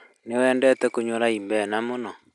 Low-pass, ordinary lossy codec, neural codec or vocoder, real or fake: 10.8 kHz; none; none; real